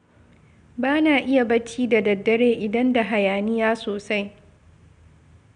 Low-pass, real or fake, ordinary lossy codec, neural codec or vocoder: 9.9 kHz; real; MP3, 96 kbps; none